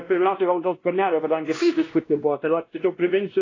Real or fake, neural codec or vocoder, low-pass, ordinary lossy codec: fake; codec, 16 kHz, 1 kbps, X-Codec, WavLM features, trained on Multilingual LibriSpeech; 7.2 kHz; AAC, 32 kbps